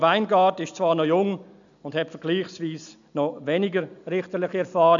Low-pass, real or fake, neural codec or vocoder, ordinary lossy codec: 7.2 kHz; real; none; none